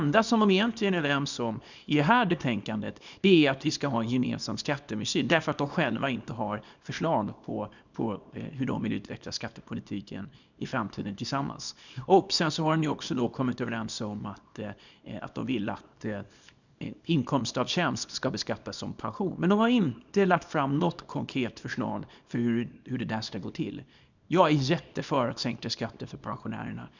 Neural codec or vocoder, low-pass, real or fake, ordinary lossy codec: codec, 24 kHz, 0.9 kbps, WavTokenizer, small release; 7.2 kHz; fake; none